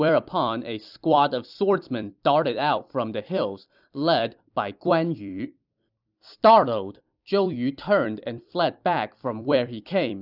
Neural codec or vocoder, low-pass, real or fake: vocoder, 44.1 kHz, 128 mel bands every 256 samples, BigVGAN v2; 5.4 kHz; fake